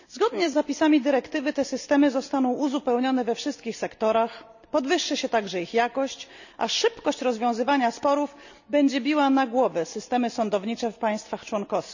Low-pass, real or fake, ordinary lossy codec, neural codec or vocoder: 7.2 kHz; real; none; none